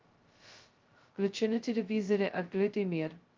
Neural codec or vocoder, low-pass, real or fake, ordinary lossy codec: codec, 16 kHz, 0.2 kbps, FocalCodec; 7.2 kHz; fake; Opus, 32 kbps